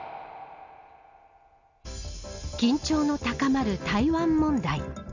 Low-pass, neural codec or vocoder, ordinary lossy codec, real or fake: 7.2 kHz; none; none; real